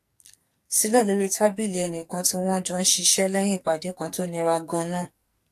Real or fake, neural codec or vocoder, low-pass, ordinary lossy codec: fake; codec, 44.1 kHz, 2.6 kbps, SNAC; 14.4 kHz; AAC, 64 kbps